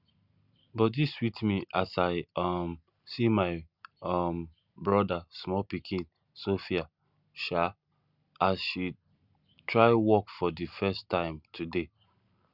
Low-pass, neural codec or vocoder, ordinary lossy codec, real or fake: 5.4 kHz; none; Opus, 64 kbps; real